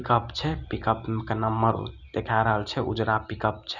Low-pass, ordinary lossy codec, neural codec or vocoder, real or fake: 7.2 kHz; none; none; real